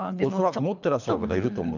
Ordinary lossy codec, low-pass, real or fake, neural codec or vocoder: none; 7.2 kHz; fake; codec, 24 kHz, 3 kbps, HILCodec